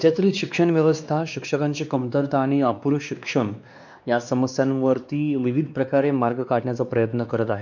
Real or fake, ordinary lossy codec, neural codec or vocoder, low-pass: fake; none; codec, 16 kHz, 2 kbps, X-Codec, WavLM features, trained on Multilingual LibriSpeech; 7.2 kHz